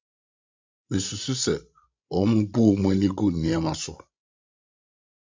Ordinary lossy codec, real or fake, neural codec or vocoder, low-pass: MP3, 64 kbps; fake; codec, 16 kHz, 16 kbps, FreqCodec, larger model; 7.2 kHz